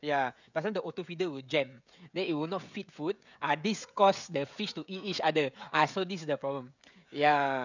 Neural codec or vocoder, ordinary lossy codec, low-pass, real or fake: codec, 16 kHz, 16 kbps, FreqCodec, smaller model; none; 7.2 kHz; fake